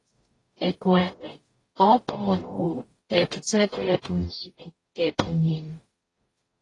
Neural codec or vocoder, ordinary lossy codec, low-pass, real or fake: codec, 44.1 kHz, 0.9 kbps, DAC; AAC, 32 kbps; 10.8 kHz; fake